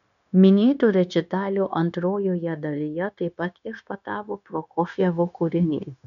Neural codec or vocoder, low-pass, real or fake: codec, 16 kHz, 0.9 kbps, LongCat-Audio-Codec; 7.2 kHz; fake